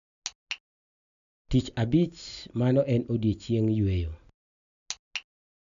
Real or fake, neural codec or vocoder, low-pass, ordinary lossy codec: real; none; 7.2 kHz; none